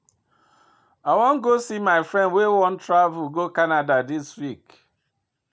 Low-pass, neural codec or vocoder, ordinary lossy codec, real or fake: none; none; none; real